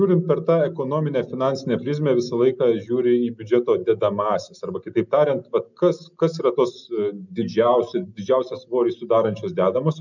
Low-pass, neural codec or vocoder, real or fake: 7.2 kHz; none; real